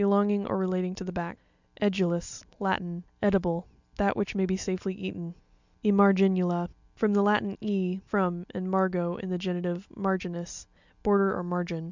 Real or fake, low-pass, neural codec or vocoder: real; 7.2 kHz; none